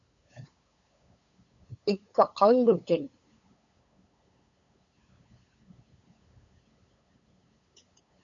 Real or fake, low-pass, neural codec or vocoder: fake; 7.2 kHz; codec, 16 kHz, 8 kbps, FunCodec, trained on LibriTTS, 25 frames a second